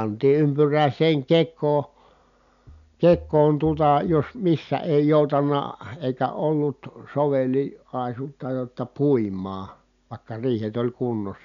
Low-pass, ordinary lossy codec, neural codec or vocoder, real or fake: 7.2 kHz; none; none; real